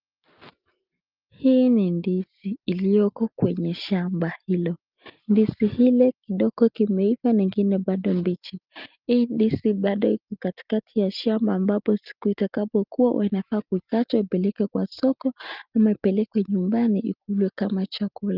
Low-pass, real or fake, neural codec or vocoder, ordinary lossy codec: 5.4 kHz; real; none; Opus, 32 kbps